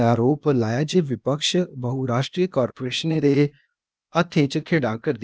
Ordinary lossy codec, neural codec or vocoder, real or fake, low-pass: none; codec, 16 kHz, 0.8 kbps, ZipCodec; fake; none